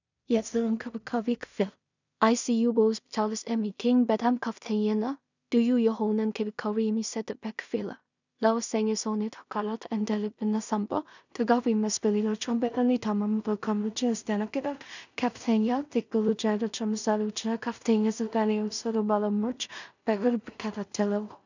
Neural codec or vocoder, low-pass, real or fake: codec, 16 kHz in and 24 kHz out, 0.4 kbps, LongCat-Audio-Codec, two codebook decoder; 7.2 kHz; fake